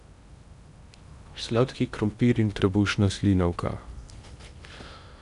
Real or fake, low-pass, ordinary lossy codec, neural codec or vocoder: fake; 10.8 kHz; none; codec, 16 kHz in and 24 kHz out, 0.8 kbps, FocalCodec, streaming, 65536 codes